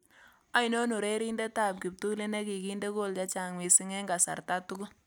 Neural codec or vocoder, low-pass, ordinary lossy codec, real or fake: none; none; none; real